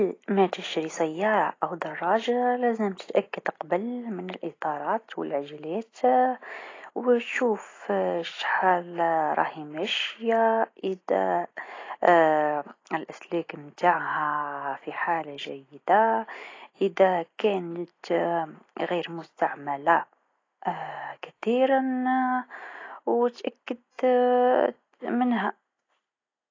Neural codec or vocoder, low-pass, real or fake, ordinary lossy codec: none; 7.2 kHz; real; AAC, 32 kbps